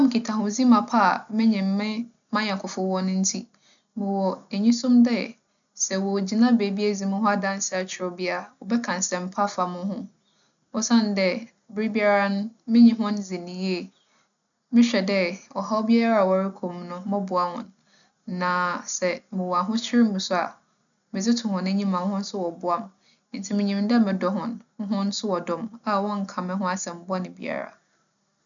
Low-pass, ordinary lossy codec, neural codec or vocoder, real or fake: 7.2 kHz; none; none; real